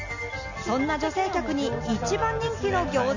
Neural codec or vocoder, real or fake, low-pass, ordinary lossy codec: none; real; 7.2 kHz; none